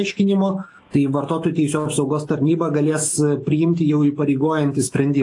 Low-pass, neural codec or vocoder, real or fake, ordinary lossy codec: 10.8 kHz; autoencoder, 48 kHz, 128 numbers a frame, DAC-VAE, trained on Japanese speech; fake; AAC, 48 kbps